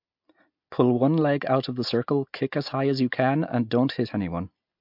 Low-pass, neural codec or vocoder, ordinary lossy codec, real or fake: 5.4 kHz; none; MP3, 48 kbps; real